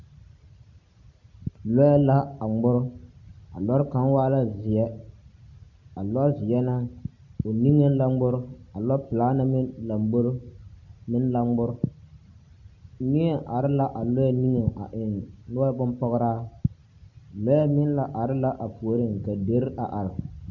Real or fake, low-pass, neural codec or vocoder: real; 7.2 kHz; none